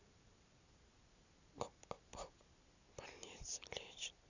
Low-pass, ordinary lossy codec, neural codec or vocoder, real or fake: 7.2 kHz; none; none; real